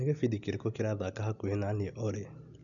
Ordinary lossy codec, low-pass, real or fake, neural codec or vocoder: none; 7.2 kHz; real; none